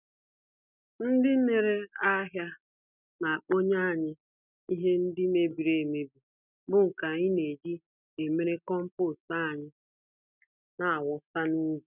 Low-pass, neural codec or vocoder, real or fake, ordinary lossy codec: 3.6 kHz; none; real; none